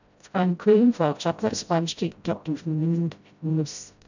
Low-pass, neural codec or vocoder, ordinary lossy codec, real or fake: 7.2 kHz; codec, 16 kHz, 0.5 kbps, FreqCodec, smaller model; none; fake